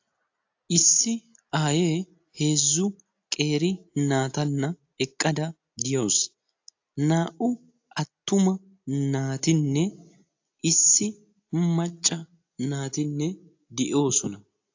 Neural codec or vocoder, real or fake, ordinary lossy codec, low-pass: none; real; AAC, 48 kbps; 7.2 kHz